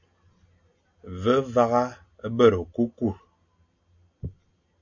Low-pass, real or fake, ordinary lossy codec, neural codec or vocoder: 7.2 kHz; real; AAC, 48 kbps; none